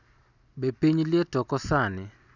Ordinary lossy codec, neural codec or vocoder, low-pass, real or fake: none; none; 7.2 kHz; real